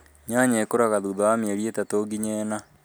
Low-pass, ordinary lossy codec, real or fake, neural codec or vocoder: none; none; real; none